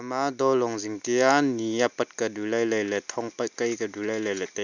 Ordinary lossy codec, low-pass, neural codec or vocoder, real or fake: none; 7.2 kHz; none; real